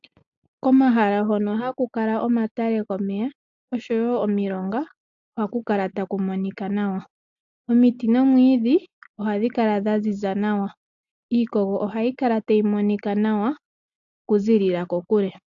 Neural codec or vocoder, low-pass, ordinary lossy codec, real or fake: none; 7.2 kHz; AAC, 64 kbps; real